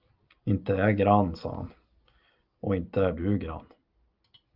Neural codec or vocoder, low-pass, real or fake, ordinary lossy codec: none; 5.4 kHz; real; Opus, 32 kbps